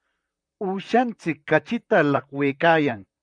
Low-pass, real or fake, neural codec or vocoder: 9.9 kHz; fake; vocoder, 44.1 kHz, 128 mel bands, Pupu-Vocoder